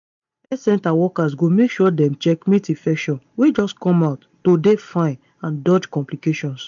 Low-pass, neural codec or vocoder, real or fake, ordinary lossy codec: 7.2 kHz; none; real; MP3, 64 kbps